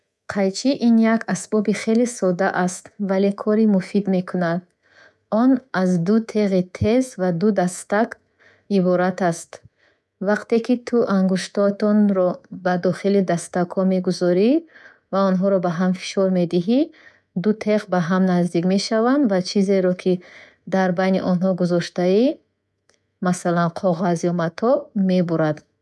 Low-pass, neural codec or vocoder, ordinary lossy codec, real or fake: none; codec, 24 kHz, 3.1 kbps, DualCodec; none; fake